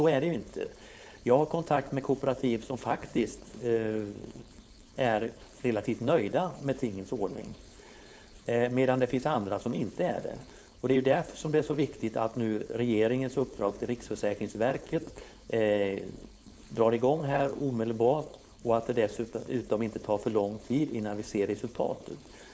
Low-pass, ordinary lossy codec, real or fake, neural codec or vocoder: none; none; fake; codec, 16 kHz, 4.8 kbps, FACodec